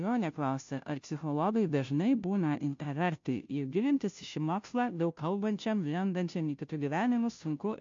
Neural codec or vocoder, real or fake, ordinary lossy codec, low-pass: codec, 16 kHz, 0.5 kbps, FunCodec, trained on Chinese and English, 25 frames a second; fake; MP3, 48 kbps; 7.2 kHz